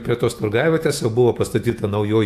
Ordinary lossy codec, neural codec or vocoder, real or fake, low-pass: AAC, 64 kbps; autoencoder, 48 kHz, 128 numbers a frame, DAC-VAE, trained on Japanese speech; fake; 14.4 kHz